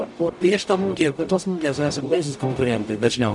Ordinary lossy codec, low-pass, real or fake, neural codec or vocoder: MP3, 96 kbps; 10.8 kHz; fake; codec, 44.1 kHz, 0.9 kbps, DAC